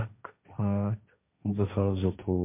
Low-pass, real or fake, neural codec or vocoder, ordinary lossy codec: 3.6 kHz; fake; codec, 16 kHz, 1.1 kbps, Voila-Tokenizer; MP3, 32 kbps